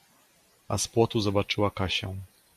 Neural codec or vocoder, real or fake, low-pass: none; real; 14.4 kHz